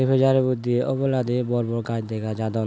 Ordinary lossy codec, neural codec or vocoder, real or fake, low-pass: none; none; real; none